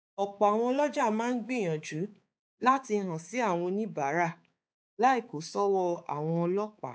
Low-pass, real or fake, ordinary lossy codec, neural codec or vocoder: none; fake; none; codec, 16 kHz, 4 kbps, X-Codec, HuBERT features, trained on balanced general audio